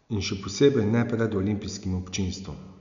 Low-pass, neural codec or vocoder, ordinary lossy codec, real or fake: 7.2 kHz; none; none; real